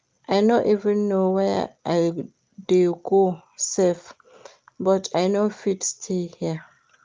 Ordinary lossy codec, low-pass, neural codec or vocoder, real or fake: Opus, 24 kbps; 7.2 kHz; none; real